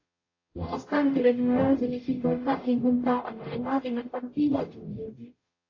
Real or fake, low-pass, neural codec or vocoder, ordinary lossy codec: fake; 7.2 kHz; codec, 44.1 kHz, 0.9 kbps, DAC; AAC, 32 kbps